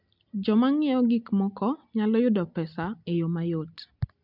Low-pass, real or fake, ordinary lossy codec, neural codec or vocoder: 5.4 kHz; real; MP3, 48 kbps; none